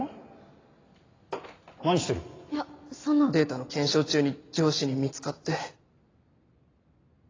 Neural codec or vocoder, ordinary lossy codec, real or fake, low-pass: none; AAC, 32 kbps; real; 7.2 kHz